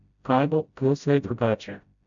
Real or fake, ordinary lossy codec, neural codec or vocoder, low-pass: fake; none; codec, 16 kHz, 0.5 kbps, FreqCodec, smaller model; 7.2 kHz